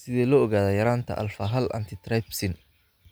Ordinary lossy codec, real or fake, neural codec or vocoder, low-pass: none; real; none; none